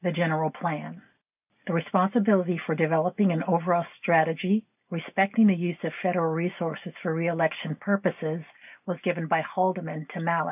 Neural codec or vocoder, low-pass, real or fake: none; 3.6 kHz; real